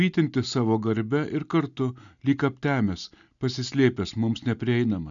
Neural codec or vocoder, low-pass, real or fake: none; 7.2 kHz; real